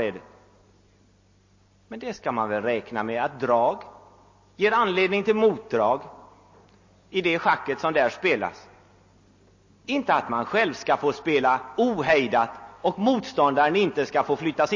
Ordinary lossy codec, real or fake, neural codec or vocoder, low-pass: MP3, 32 kbps; real; none; 7.2 kHz